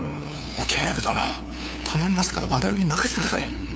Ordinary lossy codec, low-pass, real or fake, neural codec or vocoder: none; none; fake; codec, 16 kHz, 2 kbps, FunCodec, trained on LibriTTS, 25 frames a second